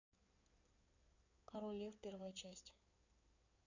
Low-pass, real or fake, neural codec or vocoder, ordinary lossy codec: 7.2 kHz; fake; codec, 16 kHz in and 24 kHz out, 2.2 kbps, FireRedTTS-2 codec; none